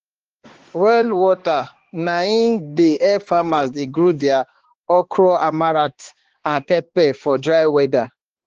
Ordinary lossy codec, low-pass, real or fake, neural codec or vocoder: Opus, 16 kbps; 7.2 kHz; fake; codec, 16 kHz, 2 kbps, X-Codec, HuBERT features, trained on balanced general audio